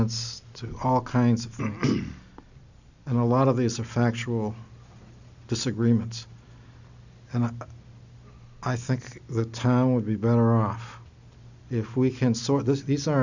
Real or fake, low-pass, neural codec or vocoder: real; 7.2 kHz; none